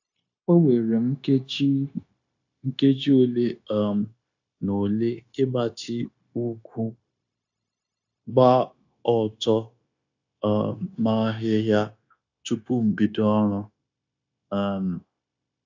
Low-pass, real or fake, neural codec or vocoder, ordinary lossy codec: 7.2 kHz; fake; codec, 16 kHz, 0.9 kbps, LongCat-Audio-Codec; AAC, 48 kbps